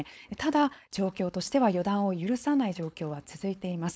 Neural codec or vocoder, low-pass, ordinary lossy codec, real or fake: codec, 16 kHz, 4.8 kbps, FACodec; none; none; fake